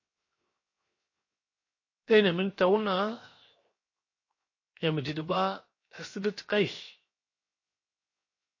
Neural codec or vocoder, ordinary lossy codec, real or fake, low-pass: codec, 16 kHz, 0.7 kbps, FocalCodec; MP3, 32 kbps; fake; 7.2 kHz